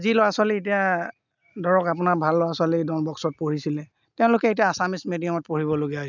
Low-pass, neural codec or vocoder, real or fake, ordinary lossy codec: 7.2 kHz; none; real; none